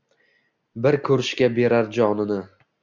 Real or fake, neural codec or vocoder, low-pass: real; none; 7.2 kHz